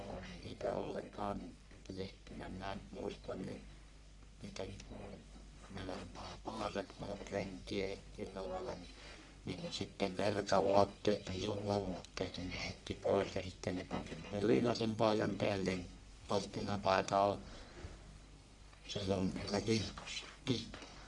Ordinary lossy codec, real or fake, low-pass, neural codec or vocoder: none; fake; 10.8 kHz; codec, 44.1 kHz, 1.7 kbps, Pupu-Codec